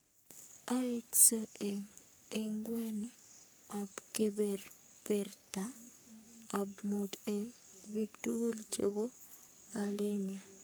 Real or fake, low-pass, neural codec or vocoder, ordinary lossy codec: fake; none; codec, 44.1 kHz, 3.4 kbps, Pupu-Codec; none